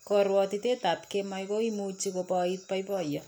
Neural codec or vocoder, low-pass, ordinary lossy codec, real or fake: none; none; none; real